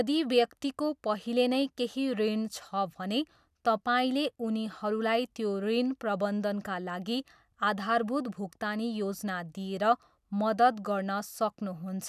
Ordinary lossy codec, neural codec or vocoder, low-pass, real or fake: none; none; 14.4 kHz; real